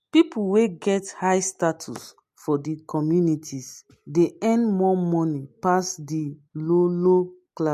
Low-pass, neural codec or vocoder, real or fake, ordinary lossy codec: 14.4 kHz; none; real; MP3, 64 kbps